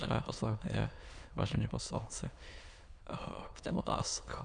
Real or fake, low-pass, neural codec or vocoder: fake; 9.9 kHz; autoencoder, 22.05 kHz, a latent of 192 numbers a frame, VITS, trained on many speakers